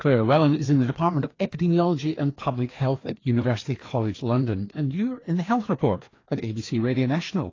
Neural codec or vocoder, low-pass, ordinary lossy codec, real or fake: codec, 16 kHz, 2 kbps, FreqCodec, larger model; 7.2 kHz; AAC, 32 kbps; fake